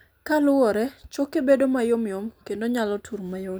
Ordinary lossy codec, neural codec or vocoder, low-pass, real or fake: none; none; none; real